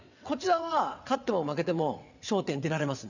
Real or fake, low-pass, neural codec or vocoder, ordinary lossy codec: fake; 7.2 kHz; vocoder, 22.05 kHz, 80 mel bands, WaveNeXt; none